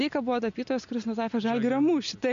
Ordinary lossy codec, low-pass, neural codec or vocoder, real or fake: MP3, 64 kbps; 7.2 kHz; none; real